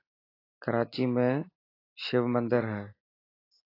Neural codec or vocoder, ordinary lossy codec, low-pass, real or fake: none; AAC, 24 kbps; 5.4 kHz; real